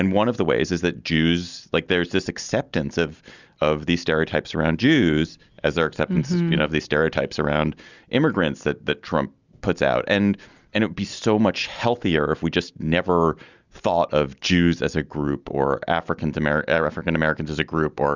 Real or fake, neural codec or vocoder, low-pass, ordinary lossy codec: real; none; 7.2 kHz; Opus, 64 kbps